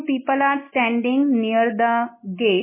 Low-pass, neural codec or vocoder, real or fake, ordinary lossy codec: 3.6 kHz; none; real; MP3, 16 kbps